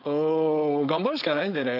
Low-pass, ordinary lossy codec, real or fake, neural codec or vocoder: 5.4 kHz; none; fake; codec, 16 kHz, 4.8 kbps, FACodec